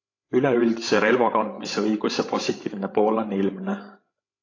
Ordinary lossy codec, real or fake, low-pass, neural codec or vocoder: AAC, 32 kbps; fake; 7.2 kHz; codec, 16 kHz, 16 kbps, FreqCodec, larger model